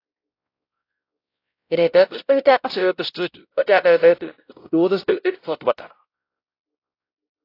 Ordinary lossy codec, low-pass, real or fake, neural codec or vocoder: AAC, 32 kbps; 5.4 kHz; fake; codec, 16 kHz, 0.5 kbps, X-Codec, WavLM features, trained on Multilingual LibriSpeech